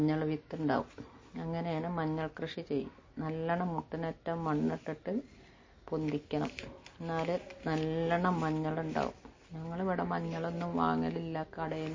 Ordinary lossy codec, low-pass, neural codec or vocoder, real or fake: MP3, 32 kbps; 7.2 kHz; none; real